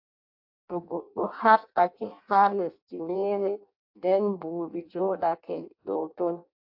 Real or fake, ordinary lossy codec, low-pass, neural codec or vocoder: fake; AAC, 48 kbps; 5.4 kHz; codec, 16 kHz in and 24 kHz out, 0.6 kbps, FireRedTTS-2 codec